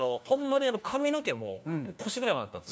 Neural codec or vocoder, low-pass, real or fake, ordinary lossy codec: codec, 16 kHz, 1 kbps, FunCodec, trained on LibriTTS, 50 frames a second; none; fake; none